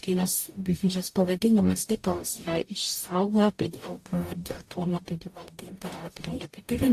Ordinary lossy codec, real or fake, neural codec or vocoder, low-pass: AAC, 64 kbps; fake; codec, 44.1 kHz, 0.9 kbps, DAC; 14.4 kHz